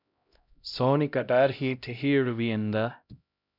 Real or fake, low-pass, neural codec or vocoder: fake; 5.4 kHz; codec, 16 kHz, 0.5 kbps, X-Codec, HuBERT features, trained on LibriSpeech